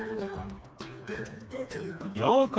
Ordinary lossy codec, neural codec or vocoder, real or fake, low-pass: none; codec, 16 kHz, 2 kbps, FreqCodec, smaller model; fake; none